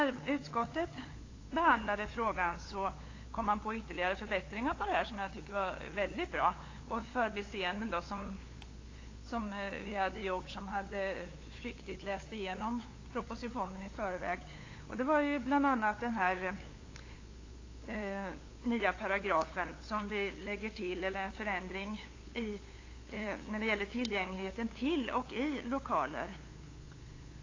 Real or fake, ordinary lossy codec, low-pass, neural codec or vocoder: fake; AAC, 32 kbps; 7.2 kHz; codec, 16 kHz, 8 kbps, FunCodec, trained on LibriTTS, 25 frames a second